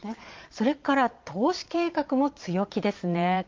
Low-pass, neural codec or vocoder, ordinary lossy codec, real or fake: 7.2 kHz; vocoder, 22.05 kHz, 80 mel bands, Vocos; Opus, 24 kbps; fake